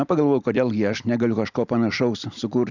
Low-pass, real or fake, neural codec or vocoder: 7.2 kHz; real; none